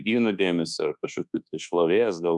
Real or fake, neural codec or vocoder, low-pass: fake; codec, 24 kHz, 1.2 kbps, DualCodec; 10.8 kHz